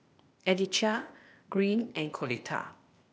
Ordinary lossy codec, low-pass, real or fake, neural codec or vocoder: none; none; fake; codec, 16 kHz, 0.8 kbps, ZipCodec